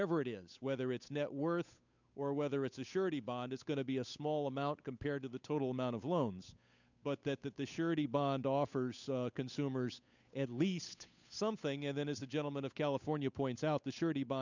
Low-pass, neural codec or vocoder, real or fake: 7.2 kHz; codec, 16 kHz, 8 kbps, FunCodec, trained on Chinese and English, 25 frames a second; fake